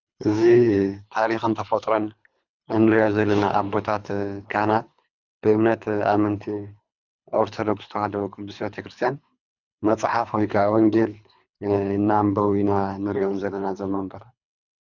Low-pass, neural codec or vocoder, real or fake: 7.2 kHz; codec, 24 kHz, 3 kbps, HILCodec; fake